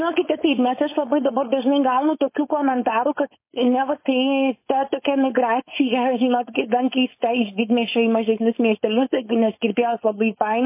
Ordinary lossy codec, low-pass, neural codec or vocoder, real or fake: MP3, 24 kbps; 3.6 kHz; codec, 16 kHz, 4.8 kbps, FACodec; fake